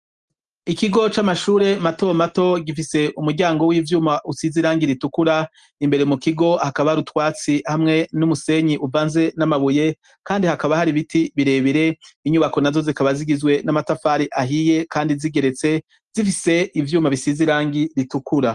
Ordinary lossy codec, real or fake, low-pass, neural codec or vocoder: Opus, 24 kbps; real; 10.8 kHz; none